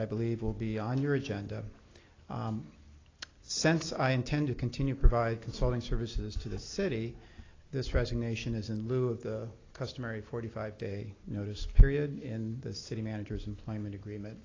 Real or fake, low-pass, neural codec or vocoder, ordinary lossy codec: real; 7.2 kHz; none; AAC, 32 kbps